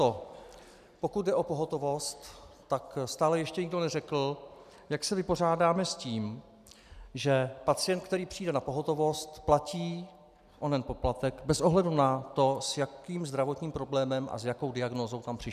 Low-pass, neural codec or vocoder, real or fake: 14.4 kHz; none; real